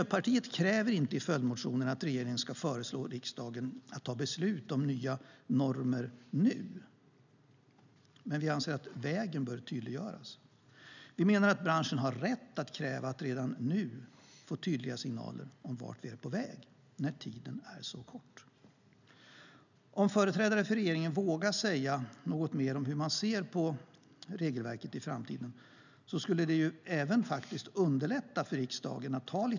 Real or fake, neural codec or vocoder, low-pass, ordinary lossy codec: real; none; 7.2 kHz; none